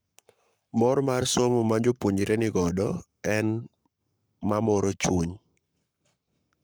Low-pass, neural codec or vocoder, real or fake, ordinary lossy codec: none; codec, 44.1 kHz, 7.8 kbps, Pupu-Codec; fake; none